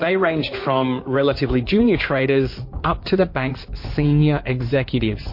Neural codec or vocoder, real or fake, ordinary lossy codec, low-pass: codec, 16 kHz in and 24 kHz out, 2.2 kbps, FireRedTTS-2 codec; fake; MP3, 32 kbps; 5.4 kHz